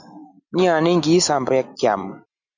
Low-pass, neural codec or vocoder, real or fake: 7.2 kHz; none; real